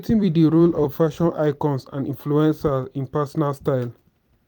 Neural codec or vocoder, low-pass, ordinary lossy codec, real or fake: vocoder, 44.1 kHz, 128 mel bands every 256 samples, BigVGAN v2; 19.8 kHz; none; fake